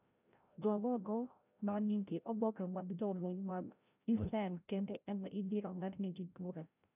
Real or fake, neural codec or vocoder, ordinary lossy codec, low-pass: fake; codec, 16 kHz, 0.5 kbps, FreqCodec, larger model; none; 3.6 kHz